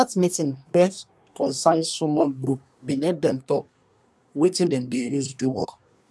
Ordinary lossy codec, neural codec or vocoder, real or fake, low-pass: none; codec, 24 kHz, 1 kbps, SNAC; fake; none